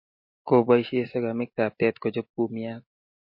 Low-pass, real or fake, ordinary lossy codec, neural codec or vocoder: 5.4 kHz; real; MP3, 32 kbps; none